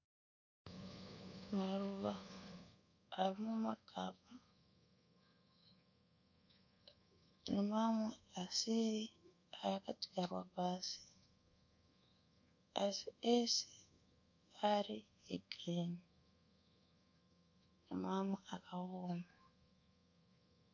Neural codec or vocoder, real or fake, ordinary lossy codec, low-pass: codec, 24 kHz, 1.2 kbps, DualCodec; fake; AAC, 48 kbps; 7.2 kHz